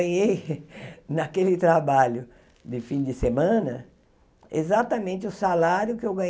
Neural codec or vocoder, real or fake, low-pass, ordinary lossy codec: none; real; none; none